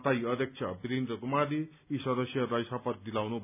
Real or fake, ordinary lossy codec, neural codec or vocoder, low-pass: real; none; none; 3.6 kHz